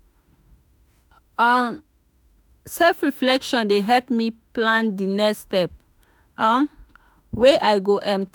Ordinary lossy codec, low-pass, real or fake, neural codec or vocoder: none; none; fake; autoencoder, 48 kHz, 32 numbers a frame, DAC-VAE, trained on Japanese speech